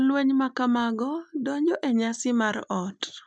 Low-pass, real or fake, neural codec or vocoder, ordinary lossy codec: 9.9 kHz; real; none; none